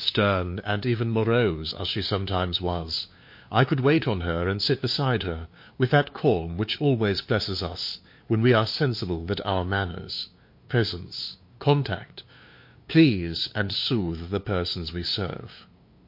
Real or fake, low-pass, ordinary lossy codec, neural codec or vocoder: fake; 5.4 kHz; MP3, 32 kbps; codec, 16 kHz, 2 kbps, FunCodec, trained on LibriTTS, 25 frames a second